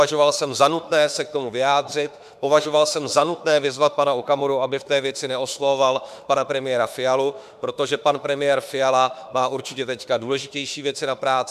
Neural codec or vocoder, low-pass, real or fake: autoencoder, 48 kHz, 32 numbers a frame, DAC-VAE, trained on Japanese speech; 14.4 kHz; fake